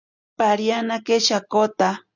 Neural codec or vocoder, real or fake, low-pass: none; real; 7.2 kHz